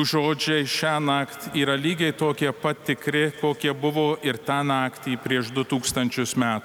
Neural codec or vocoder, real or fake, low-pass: none; real; 19.8 kHz